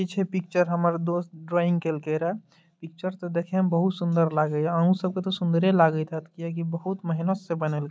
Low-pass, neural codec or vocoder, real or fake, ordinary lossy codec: none; none; real; none